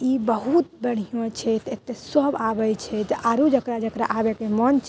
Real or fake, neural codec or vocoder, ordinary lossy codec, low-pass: real; none; none; none